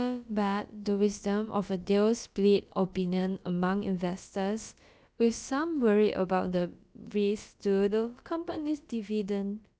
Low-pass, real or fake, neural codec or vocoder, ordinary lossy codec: none; fake; codec, 16 kHz, about 1 kbps, DyCAST, with the encoder's durations; none